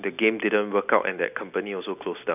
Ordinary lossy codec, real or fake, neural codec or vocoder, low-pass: none; real; none; 3.6 kHz